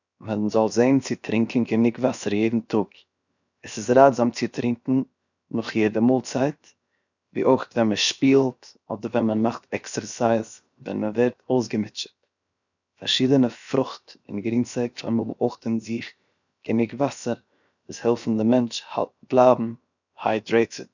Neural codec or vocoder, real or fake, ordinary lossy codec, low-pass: codec, 16 kHz, 0.7 kbps, FocalCodec; fake; none; 7.2 kHz